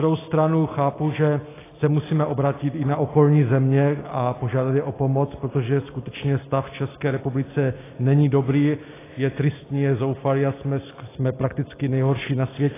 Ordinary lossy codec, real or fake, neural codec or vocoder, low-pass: AAC, 16 kbps; real; none; 3.6 kHz